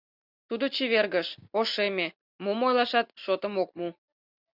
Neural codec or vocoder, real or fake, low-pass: none; real; 5.4 kHz